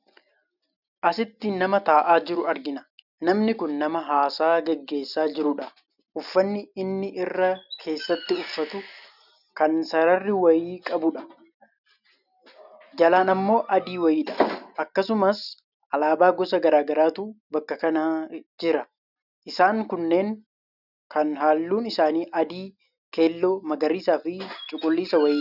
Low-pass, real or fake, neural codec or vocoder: 5.4 kHz; real; none